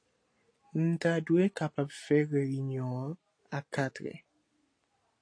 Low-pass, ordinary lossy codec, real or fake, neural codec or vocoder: 9.9 kHz; AAC, 48 kbps; real; none